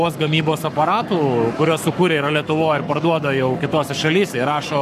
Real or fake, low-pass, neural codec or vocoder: fake; 14.4 kHz; codec, 44.1 kHz, 7.8 kbps, Pupu-Codec